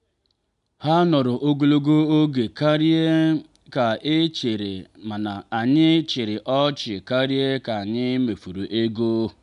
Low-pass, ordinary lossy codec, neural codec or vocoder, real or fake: 10.8 kHz; none; none; real